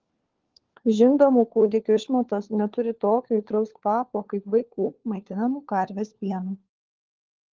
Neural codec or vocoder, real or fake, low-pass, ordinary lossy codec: codec, 16 kHz, 4 kbps, FunCodec, trained on LibriTTS, 50 frames a second; fake; 7.2 kHz; Opus, 16 kbps